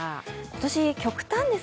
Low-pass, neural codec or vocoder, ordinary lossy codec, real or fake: none; none; none; real